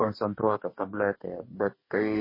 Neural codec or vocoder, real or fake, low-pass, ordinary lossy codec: codec, 44.1 kHz, 2.6 kbps, DAC; fake; 5.4 kHz; MP3, 24 kbps